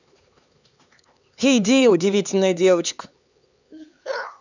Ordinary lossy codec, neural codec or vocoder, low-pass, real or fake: none; codec, 16 kHz, 4 kbps, X-Codec, HuBERT features, trained on LibriSpeech; 7.2 kHz; fake